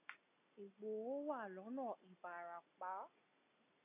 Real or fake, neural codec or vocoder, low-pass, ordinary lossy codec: real; none; 3.6 kHz; AAC, 32 kbps